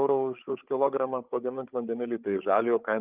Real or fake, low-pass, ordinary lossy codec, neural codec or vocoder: fake; 3.6 kHz; Opus, 24 kbps; codec, 16 kHz, 8 kbps, FreqCodec, larger model